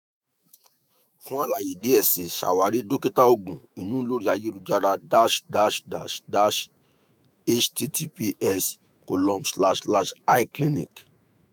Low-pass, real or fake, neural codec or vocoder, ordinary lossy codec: none; fake; autoencoder, 48 kHz, 128 numbers a frame, DAC-VAE, trained on Japanese speech; none